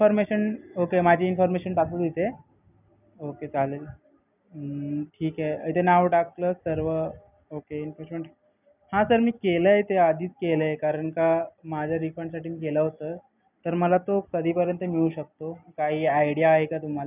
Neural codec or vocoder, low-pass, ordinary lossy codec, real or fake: none; 3.6 kHz; none; real